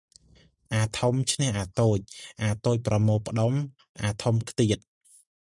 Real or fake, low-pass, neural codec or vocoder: real; 10.8 kHz; none